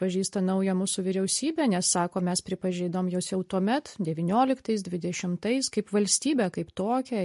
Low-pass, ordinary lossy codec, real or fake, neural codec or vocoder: 14.4 kHz; MP3, 48 kbps; real; none